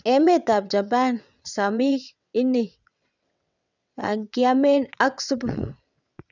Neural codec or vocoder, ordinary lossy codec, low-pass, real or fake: vocoder, 22.05 kHz, 80 mel bands, WaveNeXt; none; 7.2 kHz; fake